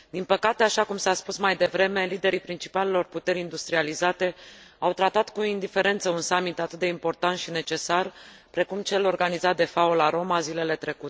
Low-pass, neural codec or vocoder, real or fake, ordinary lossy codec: none; none; real; none